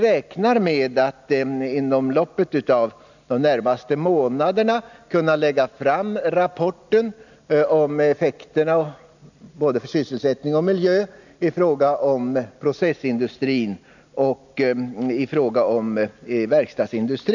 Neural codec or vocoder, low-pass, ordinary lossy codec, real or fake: none; 7.2 kHz; none; real